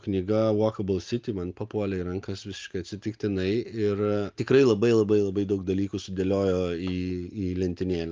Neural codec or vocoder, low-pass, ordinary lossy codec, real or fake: none; 7.2 kHz; Opus, 24 kbps; real